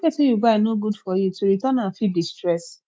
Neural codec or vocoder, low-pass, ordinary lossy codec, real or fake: none; none; none; real